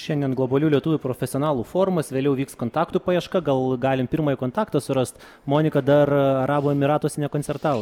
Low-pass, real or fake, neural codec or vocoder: 19.8 kHz; real; none